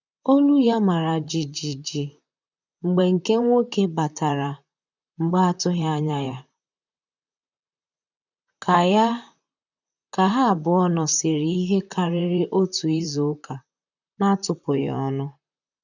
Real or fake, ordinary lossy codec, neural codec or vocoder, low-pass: fake; none; vocoder, 44.1 kHz, 128 mel bands, Pupu-Vocoder; 7.2 kHz